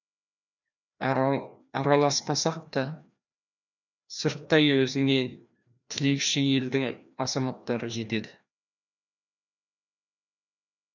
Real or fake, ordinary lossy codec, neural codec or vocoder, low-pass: fake; none; codec, 16 kHz, 1 kbps, FreqCodec, larger model; 7.2 kHz